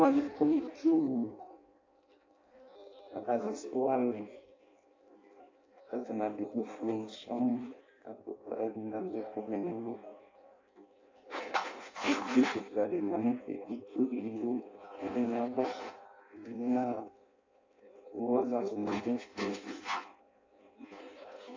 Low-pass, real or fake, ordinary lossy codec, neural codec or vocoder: 7.2 kHz; fake; AAC, 32 kbps; codec, 16 kHz in and 24 kHz out, 0.6 kbps, FireRedTTS-2 codec